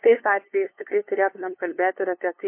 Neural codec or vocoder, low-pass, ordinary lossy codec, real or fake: codec, 16 kHz, 2 kbps, FunCodec, trained on LibriTTS, 25 frames a second; 3.6 kHz; MP3, 24 kbps; fake